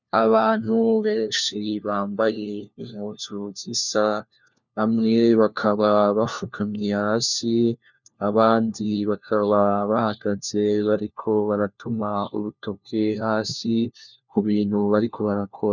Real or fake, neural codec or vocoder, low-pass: fake; codec, 16 kHz, 1 kbps, FunCodec, trained on LibriTTS, 50 frames a second; 7.2 kHz